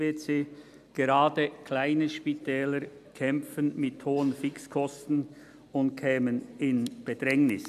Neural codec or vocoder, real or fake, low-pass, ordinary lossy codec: none; real; 14.4 kHz; none